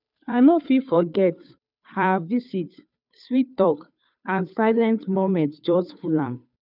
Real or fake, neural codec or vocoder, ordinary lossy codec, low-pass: fake; codec, 16 kHz, 2 kbps, FunCodec, trained on Chinese and English, 25 frames a second; none; 5.4 kHz